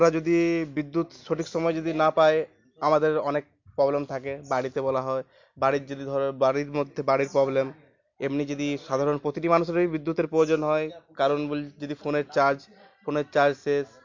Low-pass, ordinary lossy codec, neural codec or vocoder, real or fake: 7.2 kHz; MP3, 48 kbps; none; real